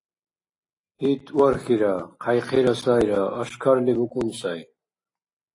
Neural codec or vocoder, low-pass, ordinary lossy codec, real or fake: none; 10.8 kHz; AAC, 32 kbps; real